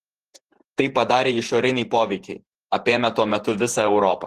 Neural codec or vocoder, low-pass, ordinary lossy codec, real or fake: vocoder, 48 kHz, 128 mel bands, Vocos; 14.4 kHz; Opus, 16 kbps; fake